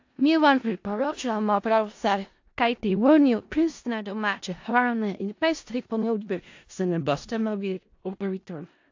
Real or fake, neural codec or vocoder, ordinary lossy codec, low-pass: fake; codec, 16 kHz in and 24 kHz out, 0.4 kbps, LongCat-Audio-Codec, four codebook decoder; AAC, 48 kbps; 7.2 kHz